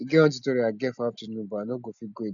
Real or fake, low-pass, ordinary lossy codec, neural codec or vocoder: real; 7.2 kHz; none; none